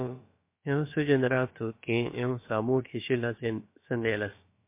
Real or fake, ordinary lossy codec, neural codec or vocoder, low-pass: fake; MP3, 24 kbps; codec, 16 kHz, about 1 kbps, DyCAST, with the encoder's durations; 3.6 kHz